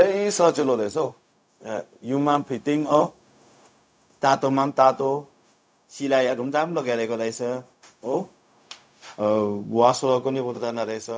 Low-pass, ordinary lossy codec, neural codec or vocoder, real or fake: none; none; codec, 16 kHz, 0.4 kbps, LongCat-Audio-Codec; fake